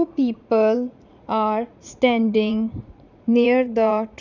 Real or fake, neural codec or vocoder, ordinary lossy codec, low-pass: fake; vocoder, 44.1 kHz, 80 mel bands, Vocos; none; 7.2 kHz